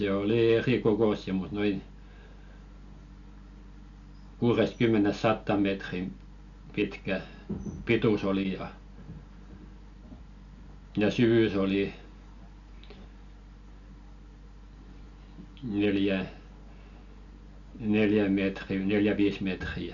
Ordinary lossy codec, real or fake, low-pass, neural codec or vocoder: none; real; 7.2 kHz; none